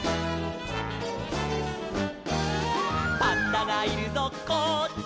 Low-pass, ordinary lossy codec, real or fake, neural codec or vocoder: none; none; real; none